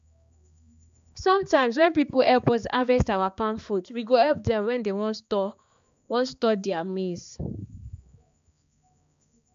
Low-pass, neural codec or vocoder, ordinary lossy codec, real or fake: 7.2 kHz; codec, 16 kHz, 2 kbps, X-Codec, HuBERT features, trained on balanced general audio; AAC, 96 kbps; fake